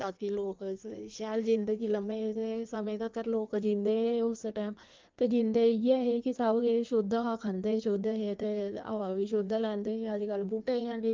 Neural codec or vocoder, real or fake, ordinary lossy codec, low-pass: codec, 16 kHz in and 24 kHz out, 1.1 kbps, FireRedTTS-2 codec; fake; Opus, 32 kbps; 7.2 kHz